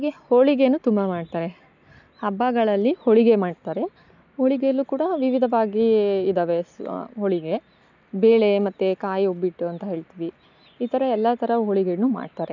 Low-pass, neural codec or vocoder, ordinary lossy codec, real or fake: 7.2 kHz; none; none; real